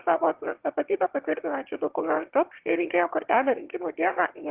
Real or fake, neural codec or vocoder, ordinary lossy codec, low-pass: fake; autoencoder, 22.05 kHz, a latent of 192 numbers a frame, VITS, trained on one speaker; Opus, 16 kbps; 3.6 kHz